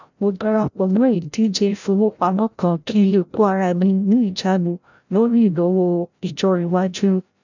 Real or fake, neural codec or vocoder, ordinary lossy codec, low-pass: fake; codec, 16 kHz, 0.5 kbps, FreqCodec, larger model; none; 7.2 kHz